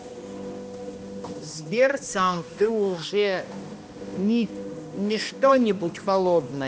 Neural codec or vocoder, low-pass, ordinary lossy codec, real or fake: codec, 16 kHz, 1 kbps, X-Codec, HuBERT features, trained on balanced general audio; none; none; fake